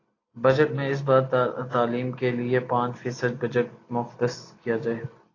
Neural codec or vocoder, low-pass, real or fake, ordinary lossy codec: none; 7.2 kHz; real; AAC, 32 kbps